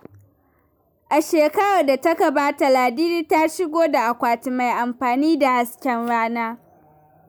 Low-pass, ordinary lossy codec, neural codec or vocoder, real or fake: none; none; none; real